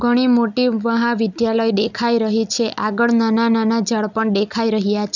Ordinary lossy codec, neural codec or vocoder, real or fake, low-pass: none; none; real; 7.2 kHz